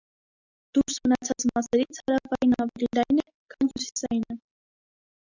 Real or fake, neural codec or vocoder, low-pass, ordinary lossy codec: real; none; 7.2 kHz; Opus, 64 kbps